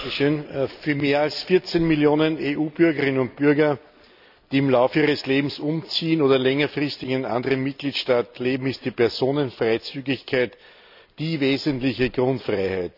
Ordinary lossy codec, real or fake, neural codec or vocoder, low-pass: none; real; none; 5.4 kHz